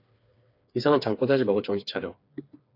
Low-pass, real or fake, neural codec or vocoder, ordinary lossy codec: 5.4 kHz; fake; codec, 16 kHz, 4 kbps, FreqCodec, smaller model; AAC, 32 kbps